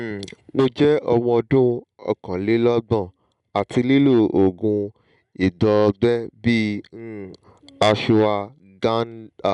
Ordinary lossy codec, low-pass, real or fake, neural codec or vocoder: none; 10.8 kHz; real; none